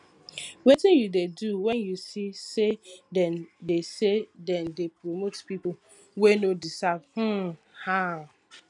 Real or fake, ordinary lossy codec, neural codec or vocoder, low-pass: real; none; none; 10.8 kHz